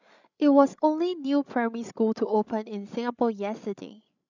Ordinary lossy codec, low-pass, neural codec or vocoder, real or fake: none; 7.2 kHz; codec, 16 kHz, 16 kbps, FreqCodec, larger model; fake